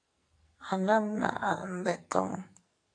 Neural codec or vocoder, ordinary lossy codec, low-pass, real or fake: codec, 44.1 kHz, 2.6 kbps, SNAC; AAC, 48 kbps; 9.9 kHz; fake